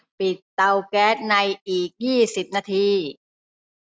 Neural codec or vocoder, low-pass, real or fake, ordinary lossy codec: none; none; real; none